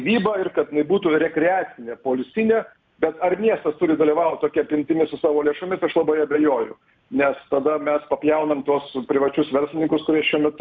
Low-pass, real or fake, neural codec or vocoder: 7.2 kHz; real; none